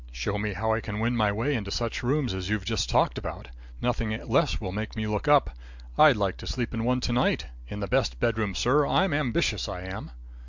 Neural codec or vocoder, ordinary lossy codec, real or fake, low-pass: none; MP3, 64 kbps; real; 7.2 kHz